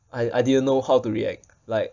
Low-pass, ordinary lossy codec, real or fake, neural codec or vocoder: 7.2 kHz; none; real; none